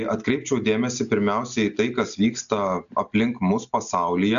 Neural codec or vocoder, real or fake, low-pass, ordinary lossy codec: none; real; 7.2 kHz; MP3, 96 kbps